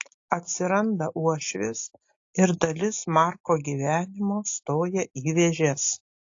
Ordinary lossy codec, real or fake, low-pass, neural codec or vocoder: AAC, 48 kbps; real; 7.2 kHz; none